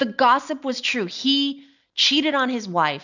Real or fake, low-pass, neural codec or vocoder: real; 7.2 kHz; none